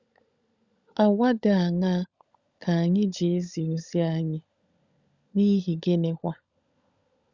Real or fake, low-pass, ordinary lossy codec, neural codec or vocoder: fake; 7.2 kHz; Opus, 64 kbps; codec, 16 kHz, 16 kbps, FunCodec, trained on LibriTTS, 50 frames a second